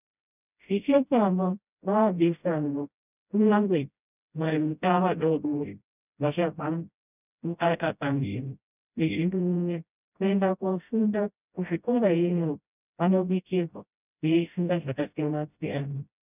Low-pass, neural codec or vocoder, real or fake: 3.6 kHz; codec, 16 kHz, 0.5 kbps, FreqCodec, smaller model; fake